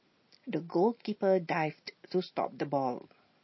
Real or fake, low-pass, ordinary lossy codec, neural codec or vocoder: real; 7.2 kHz; MP3, 24 kbps; none